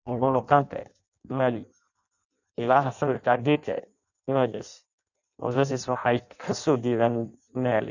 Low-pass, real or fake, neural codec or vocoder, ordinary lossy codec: 7.2 kHz; fake; codec, 16 kHz in and 24 kHz out, 0.6 kbps, FireRedTTS-2 codec; none